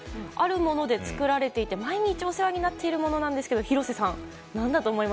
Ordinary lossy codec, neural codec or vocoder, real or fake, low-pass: none; none; real; none